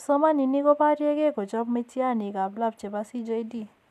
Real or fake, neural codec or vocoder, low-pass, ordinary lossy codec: real; none; 14.4 kHz; none